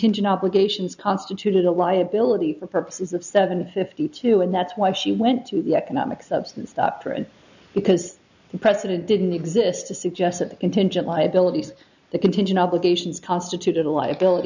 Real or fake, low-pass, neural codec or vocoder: real; 7.2 kHz; none